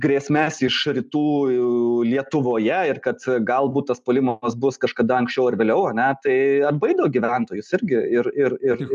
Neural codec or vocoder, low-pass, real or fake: none; 10.8 kHz; real